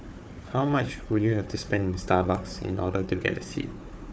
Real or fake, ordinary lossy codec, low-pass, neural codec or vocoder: fake; none; none; codec, 16 kHz, 4 kbps, FunCodec, trained on Chinese and English, 50 frames a second